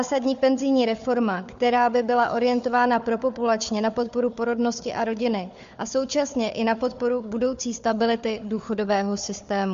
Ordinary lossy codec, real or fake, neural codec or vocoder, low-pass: MP3, 48 kbps; fake; codec, 16 kHz, 4 kbps, FunCodec, trained on Chinese and English, 50 frames a second; 7.2 kHz